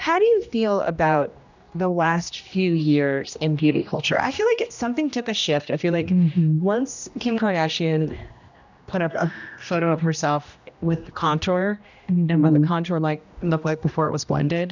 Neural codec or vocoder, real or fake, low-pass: codec, 16 kHz, 1 kbps, X-Codec, HuBERT features, trained on general audio; fake; 7.2 kHz